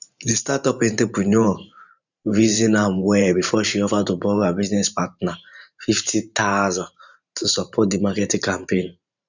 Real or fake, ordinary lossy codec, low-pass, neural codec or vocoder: fake; none; 7.2 kHz; vocoder, 44.1 kHz, 128 mel bands every 512 samples, BigVGAN v2